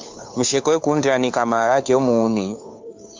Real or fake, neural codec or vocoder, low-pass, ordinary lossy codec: fake; codec, 16 kHz, 2 kbps, FunCodec, trained on Chinese and English, 25 frames a second; 7.2 kHz; MP3, 64 kbps